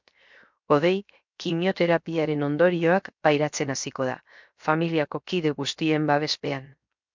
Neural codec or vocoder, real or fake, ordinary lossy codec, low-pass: codec, 16 kHz, 0.7 kbps, FocalCodec; fake; MP3, 64 kbps; 7.2 kHz